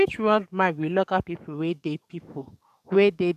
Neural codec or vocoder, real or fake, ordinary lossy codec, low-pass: codec, 44.1 kHz, 7.8 kbps, DAC; fake; none; 14.4 kHz